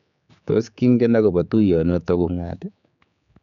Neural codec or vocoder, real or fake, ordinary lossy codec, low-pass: codec, 16 kHz, 4 kbps, X-Codec, HuBERT features, trained on general audio; fake; none; 7.2 kHz